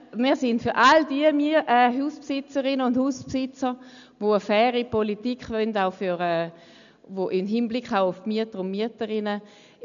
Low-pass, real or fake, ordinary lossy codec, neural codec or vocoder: 7.2 kHz; real; none; none